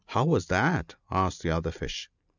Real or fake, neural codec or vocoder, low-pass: real; none; 7.2 kHz